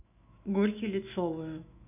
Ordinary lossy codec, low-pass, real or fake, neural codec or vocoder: none; 3.6 kHz; fake; autoencoder, 48 kHz, 128 numbers a frame, DAC-VAE, trained on Japanese speech